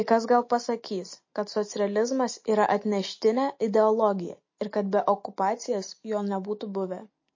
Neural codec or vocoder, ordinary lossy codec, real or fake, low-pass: none; MP3, 32 kbps; real; 7.2 kHz